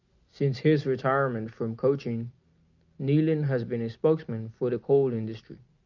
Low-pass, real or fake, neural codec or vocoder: 7.2 kHz; real; none